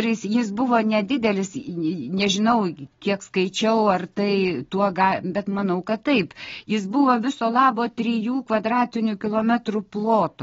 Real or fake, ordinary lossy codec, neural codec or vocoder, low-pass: real; AAC, 24 kbps; none; 7.2 kHz